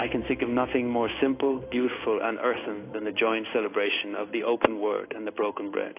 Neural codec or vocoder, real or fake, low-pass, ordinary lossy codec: codec, 16 kHz in and 24 kHz out, 1 kbps, XY-Tokenizer; fake; 3.6 kHz; AAC, 24 kbps